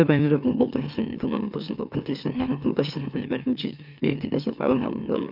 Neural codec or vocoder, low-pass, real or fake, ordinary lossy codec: autoencoder, 44.1 kHz, a latent of 192 numbers a frame, MeloTTS; 5.4 kHz; fake; none